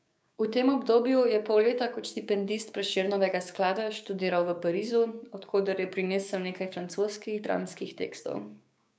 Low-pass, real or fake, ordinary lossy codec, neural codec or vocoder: none; fake; none; codec, 16 kHz, 6 kbps, DAC